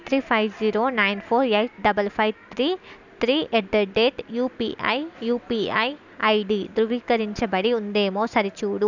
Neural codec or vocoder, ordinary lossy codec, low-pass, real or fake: none; none; 7.2 kHz; real